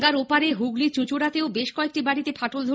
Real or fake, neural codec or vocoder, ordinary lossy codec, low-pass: real; none; none; none